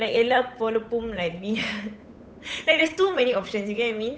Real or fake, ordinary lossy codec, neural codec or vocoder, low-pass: fake; none; codec, 16 kHz, 8 kbps, FunCodec, trained on Chinese and English, 25 frames a second; none